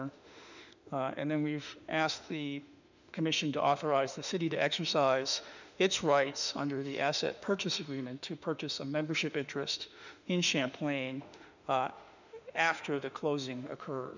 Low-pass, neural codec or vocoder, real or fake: 7.2 kHz; autoencoder, 48 kHz, 32 numbers a frame, DAC-VAE, trained on Japanese speech; fake